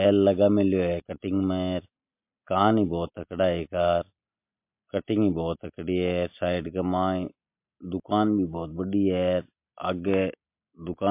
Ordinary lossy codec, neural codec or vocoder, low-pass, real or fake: MP3, 32 kbps; none; 3.6 kHz; real